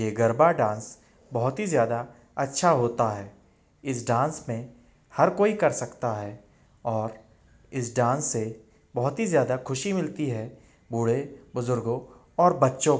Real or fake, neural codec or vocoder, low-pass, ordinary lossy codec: real; none; none; none